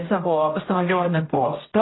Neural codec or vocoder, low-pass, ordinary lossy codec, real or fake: codec, 16 kHz, 0.5 kbps, X-Codec, HuBERT features, trained on general audio; 7.2 kHz; AAC, 16 kbps; fake